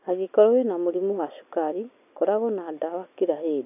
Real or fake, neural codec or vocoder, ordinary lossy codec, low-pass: real; none; none; 3.6 kHz